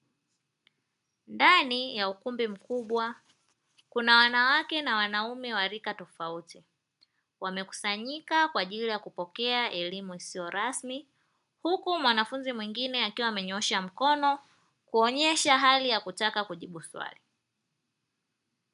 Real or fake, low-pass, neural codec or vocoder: real; 10.8 kHz; none